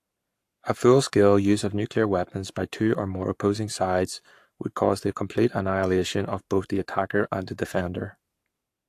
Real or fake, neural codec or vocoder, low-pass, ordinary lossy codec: fake; codec, 44.1 kHz, 7.8 kbps, Pupu-Codec; 14.4 kHz; AAC, 64 kbps